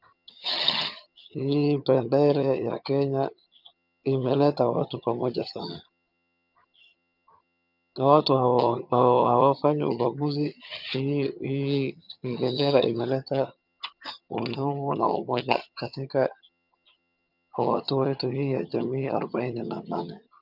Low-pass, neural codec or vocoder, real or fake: 5.4 kHz; vocoder, 22.05 kHz, 80 mel bands, HiFi-GAN; fake